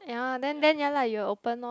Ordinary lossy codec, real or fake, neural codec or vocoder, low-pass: none; real; none; none